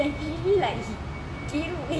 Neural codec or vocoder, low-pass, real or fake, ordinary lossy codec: none; none; real; none